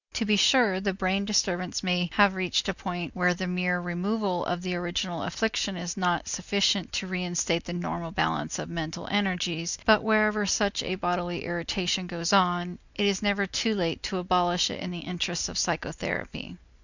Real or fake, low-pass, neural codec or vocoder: real; 7.2 kHz; none